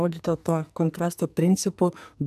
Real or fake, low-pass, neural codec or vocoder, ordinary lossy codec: fake; 14.4 kHz; codec, 44.1 kHz, 2.6 kbps, SNAC; AAC, 96 kbps